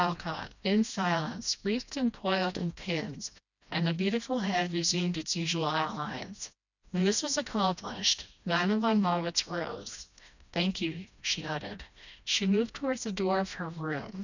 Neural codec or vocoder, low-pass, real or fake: codec, 16 kHz, 1 kbps, FreqCodec, smaller model; 7.2 kHz; fake